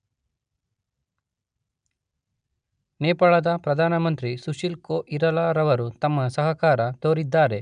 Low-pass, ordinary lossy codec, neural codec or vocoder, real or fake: 9.9 kHz; none; none; real